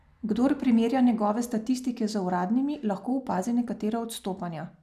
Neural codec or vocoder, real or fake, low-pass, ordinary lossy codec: none; real; 14.4 kHz; none